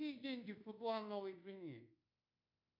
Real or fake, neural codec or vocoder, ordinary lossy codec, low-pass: fake; codec, 24 kHz, 1.2 kbps, DualCodec; AAC, 32 kbps; 5.4 kHz